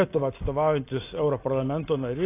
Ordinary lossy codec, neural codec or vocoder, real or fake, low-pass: AAC, 24 kbps; none; real; 3.6 kHz